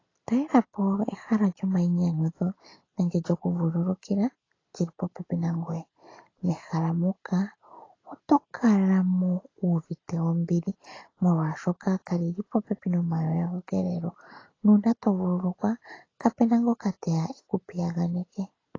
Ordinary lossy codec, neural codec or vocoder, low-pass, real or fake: AAC, 32 kbps; none; 7.2 kHz; real